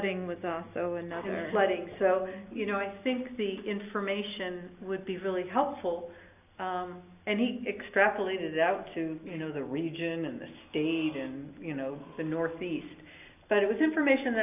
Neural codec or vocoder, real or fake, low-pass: none; real; 3.6 kHz